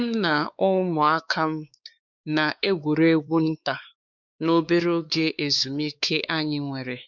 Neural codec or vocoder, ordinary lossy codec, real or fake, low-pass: codec, 16 kHz, 4 kbps, X-Codec, WavLM features, trained on Multilingual LibriSpeech; none; fake; 7.2 kHz